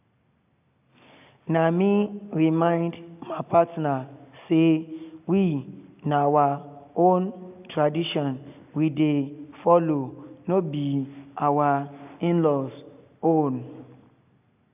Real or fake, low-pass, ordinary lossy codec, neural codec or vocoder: real; 3.6 kHz; AAC, 32 kbps; none